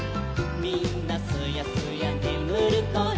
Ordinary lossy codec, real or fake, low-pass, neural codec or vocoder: none; real; none; none